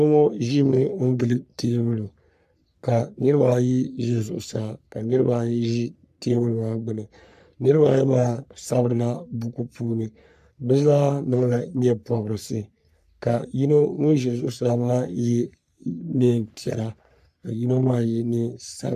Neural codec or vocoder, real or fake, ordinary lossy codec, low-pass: codec, 44.1 kHz, 3.4 kbps, Pupu-Codec; fake; AAC, 96 kbps; 14.4 kHz